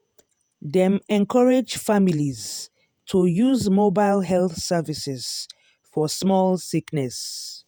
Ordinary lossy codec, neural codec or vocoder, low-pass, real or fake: none; vocoder, 48 kHz, 128 mel bands, Vocos; none; fake